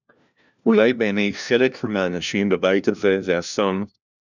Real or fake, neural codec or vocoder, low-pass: fake; codec, 16 kHz, 1 kbps, FunCodec, trained on LibriTTS, 50 frames a second; 7.2 kHz